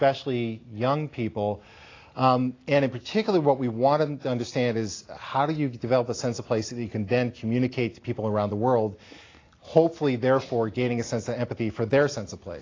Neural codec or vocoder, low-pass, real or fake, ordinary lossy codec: none; 7.2 kHz; real; AAC, 32 kbps